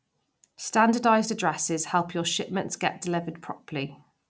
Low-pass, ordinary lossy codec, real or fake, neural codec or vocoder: none; none; real; none